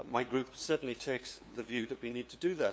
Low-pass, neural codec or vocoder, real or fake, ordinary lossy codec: none; codec, 16 kHz, 2 kbps, FunCodec, trained on LibriTTS, 25 frames a second; fake; none